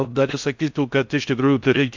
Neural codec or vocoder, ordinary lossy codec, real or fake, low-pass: codec, 16 kHz in and 24 kHz out, 0.6 kbps, FocalCodec, streaming, 4096 codes; MP3, 64 kbps; fake; 7.2 kHz